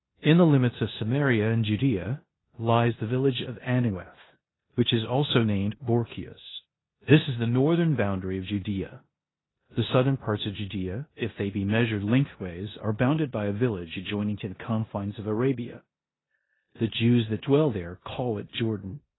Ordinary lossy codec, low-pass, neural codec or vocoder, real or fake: AAC, 16 kbps; 7.2 kHz; codec, 16 kHz in and 24 kHz out, 0.9 kbps, LongCat-Audio-Codec, four codebook decoder; fake